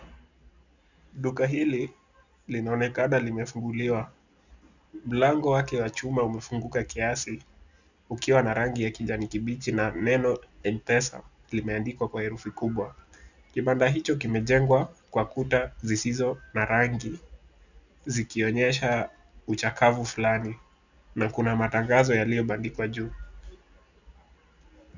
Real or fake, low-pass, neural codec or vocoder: real; 7.2 kHz; none